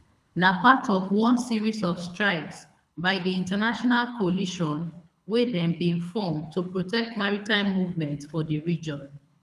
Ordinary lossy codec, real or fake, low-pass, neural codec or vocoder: none; fake; none; codec, 24 kHz, 3 kbps, HILCodec